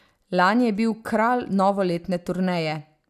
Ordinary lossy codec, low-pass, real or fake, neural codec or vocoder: none; 14.4 kHz; real; none